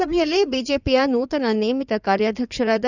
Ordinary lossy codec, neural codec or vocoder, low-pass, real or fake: none; codec, 16 kHz in and 24 kHz out, 2.2 kbps, FireRedTTS-2 codec; 7.2 kHz; fake